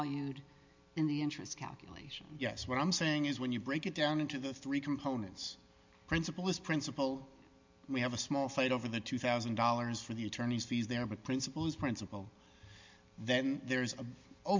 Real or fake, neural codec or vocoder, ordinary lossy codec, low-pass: real; none; MP3, 64 kbps; 7.2 kHz